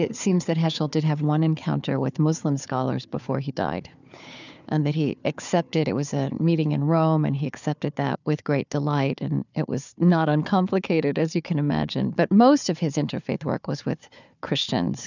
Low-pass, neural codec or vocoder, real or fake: 7.2 kHz; codec, 16 kHz, 4 kbps, FunCodec, trained on Chinese and English, 50 frames a second; fake